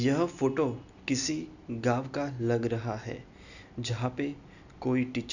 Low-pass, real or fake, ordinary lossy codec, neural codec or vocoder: 7.2 kHz; real; none; none